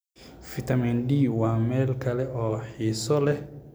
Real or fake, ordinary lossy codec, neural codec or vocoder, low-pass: real; none; none; none